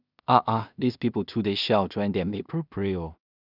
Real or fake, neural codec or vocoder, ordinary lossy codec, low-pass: fake; codec, 16 kHz in and 24 kHz out, 0.4 kbps, LongCat-Audio-Codec, two codebook decoder; none; 5.4 kHz